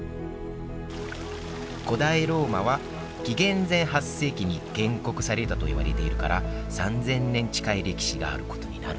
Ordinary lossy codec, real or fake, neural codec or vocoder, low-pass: none; real; none; none